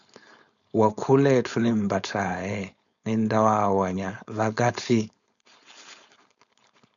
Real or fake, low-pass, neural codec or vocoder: fake; 7.2 kHz; codec, 16 kHz, 4.8 kbps, FACodec